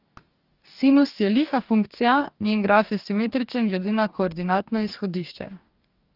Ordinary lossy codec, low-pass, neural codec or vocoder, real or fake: Opus, 24 kbps; 5.4 kHz; codec, 44.1 kHz, 2.6 kbps, DAC; fake